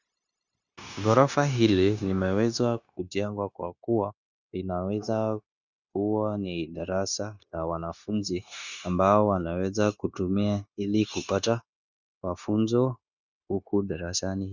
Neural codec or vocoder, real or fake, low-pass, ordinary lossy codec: codec, 16 kHz, 0.9 kbps, LongCat-Audio-Codec; fake; 7.2 kHz; Opus, 64 kbps